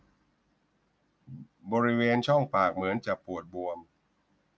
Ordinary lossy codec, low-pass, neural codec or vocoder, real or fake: none; none; none; real